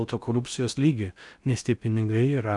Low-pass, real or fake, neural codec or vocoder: 10.8 kHz; fake; codec, 16 kHz in and 24 kHz out, 0.6 kbps, FocalCodec, streaming, 4096 codes